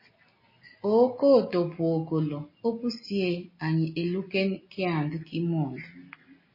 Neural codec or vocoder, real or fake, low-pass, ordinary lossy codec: none; real; 5.4 kHz; MP3, 24 kbps